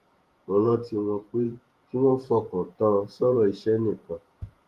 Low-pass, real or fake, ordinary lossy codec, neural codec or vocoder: 14.4 kHz; fake; Opus, 24 kbps; vocoder, 48 kHz, 128 mel bands, Vocos